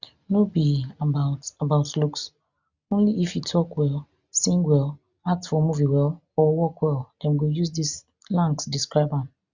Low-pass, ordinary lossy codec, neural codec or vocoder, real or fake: 7.2 kHz; Opus, 64 kbps; none; real